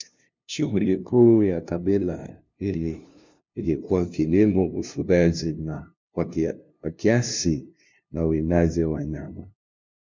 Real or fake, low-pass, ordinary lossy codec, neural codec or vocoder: fake; 7.2 kHz; MP3, 64 kbps; codec, 16 kHz, 0.5 kbps, FunCodec, trained on LibriTTS, 25 frames a second